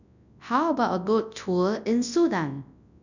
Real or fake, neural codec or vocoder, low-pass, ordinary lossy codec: fake; codec, 24 kHz, 0.9 kbps, WavTokenizer, large speech release; 7.2 kHz; none